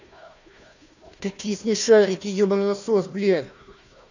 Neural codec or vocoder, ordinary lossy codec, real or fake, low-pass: codec, 16 kHz, 1 kbps, FunCodec, trained on Chinese and English, 50 frames a second; none; fake; 7.2 kHz